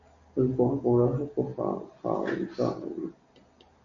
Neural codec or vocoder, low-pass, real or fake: none; 7.2 kHz; real